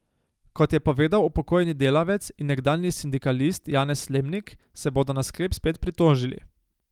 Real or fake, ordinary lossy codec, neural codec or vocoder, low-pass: real; Opus, 32 kbps; none; 19.8 kHz